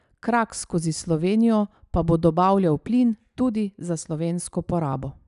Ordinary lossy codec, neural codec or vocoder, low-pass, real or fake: none; none; 10.8 kHz; real